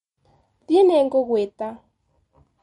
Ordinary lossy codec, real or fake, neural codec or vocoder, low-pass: MP3, 48 kbps; real; none; 19.8 kHz